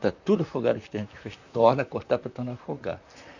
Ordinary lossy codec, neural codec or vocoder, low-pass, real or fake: none; vocoder, 44.1 kHz, 128 mel bands, Pupu-Vocoder; 7.2 kHz; fake